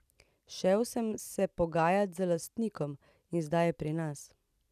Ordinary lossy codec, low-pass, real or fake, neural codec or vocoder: none; 14.4 kHz; real; none